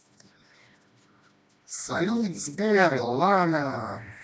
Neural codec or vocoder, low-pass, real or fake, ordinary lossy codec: codec, 16 kHz, 1 kbps, FreqCodec, smaller model; none; fake; none